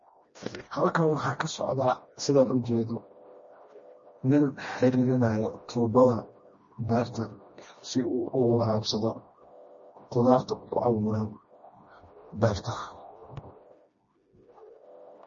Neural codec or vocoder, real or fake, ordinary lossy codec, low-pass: codec, 16 kHz, 1 kbps, FreqCodec, smaller model; fake; MP3, 32 kbps; 7.2 kHz